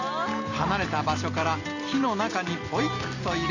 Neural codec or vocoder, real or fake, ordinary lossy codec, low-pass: none; real; none; 7.2 kHz